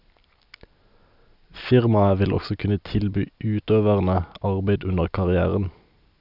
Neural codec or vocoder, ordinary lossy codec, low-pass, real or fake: none; none; 5.4 kHz; real